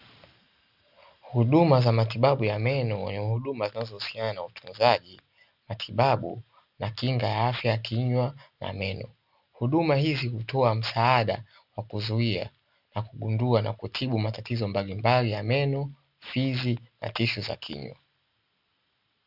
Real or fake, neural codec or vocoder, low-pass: real; none; 5.4 kHz